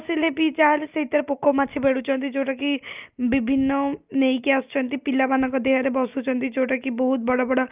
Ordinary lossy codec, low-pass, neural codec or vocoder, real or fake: Opus, 24 kbps; 3.6 kHz; none; real